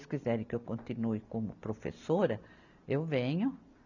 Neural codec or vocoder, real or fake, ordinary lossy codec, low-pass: none; real; none; 7.2 kHz